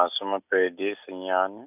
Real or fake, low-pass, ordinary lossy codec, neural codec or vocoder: real; 3.6 kHz; none; none